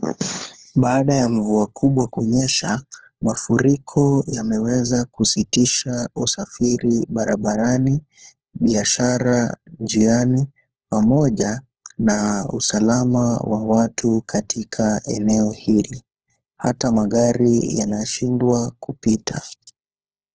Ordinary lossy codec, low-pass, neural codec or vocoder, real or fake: Opus, 16 kbps; 7.2 kHz; codec, 16 kHz, 4 kbps, FunCodec, trained on LibriTTS, 50 frames a second; fake